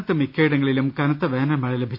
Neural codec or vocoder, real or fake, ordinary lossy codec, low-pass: none; real; none; 5.4 kHz